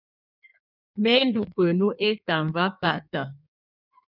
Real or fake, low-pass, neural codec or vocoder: fake; 5.4 kHz; codec, 16 kHz in and 24 kHz out, 1.1 kbps, FireRedTTS-2 codec